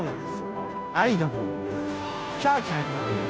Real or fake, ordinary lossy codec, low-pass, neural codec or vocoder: fake; none; none; codec, 16 kHz, 0.5 kbps, FunCodec, trained on Chinese and English, 25 frames a second